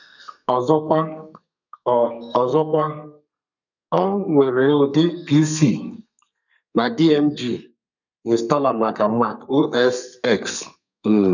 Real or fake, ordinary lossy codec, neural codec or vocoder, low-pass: fake; none; codec, 32 kHz, 1.9 kbps, SNAC; 7.2 kHz